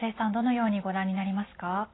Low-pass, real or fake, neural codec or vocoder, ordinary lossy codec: 7.2 kHz; real; none; AAC, 16 kbps